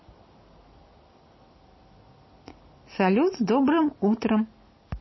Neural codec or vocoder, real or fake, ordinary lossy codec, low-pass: none; real; MP3, 24 kbps; 7.2 kHz